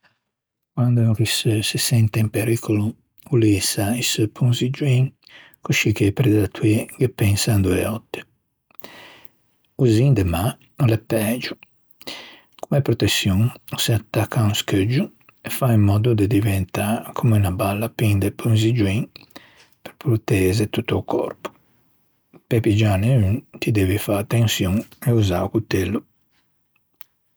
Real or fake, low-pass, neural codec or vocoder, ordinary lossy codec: real; none; none; none